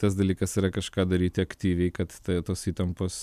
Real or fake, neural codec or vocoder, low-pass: real; none; 14.4 kHz